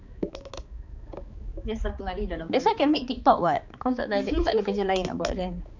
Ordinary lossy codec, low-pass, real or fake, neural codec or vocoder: none; 7.2 kHz; fake; codec, 16 kHz, 4 kbps, X-Codec, HuBERT features, trained on balanced general audio